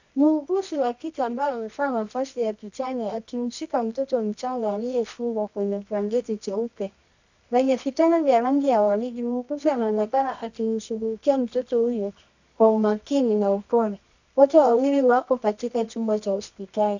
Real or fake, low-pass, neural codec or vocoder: fake; 7.2 kHz; codec, 24 kHz, 0.9 kbps, WavTokenizer, medium music audio release